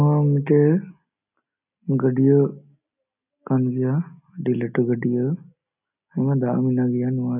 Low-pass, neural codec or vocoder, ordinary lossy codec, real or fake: 3.6 kHz; none; none; real